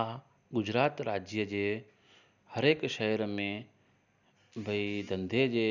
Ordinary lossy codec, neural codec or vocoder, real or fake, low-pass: none; none; real; 7.2 kHz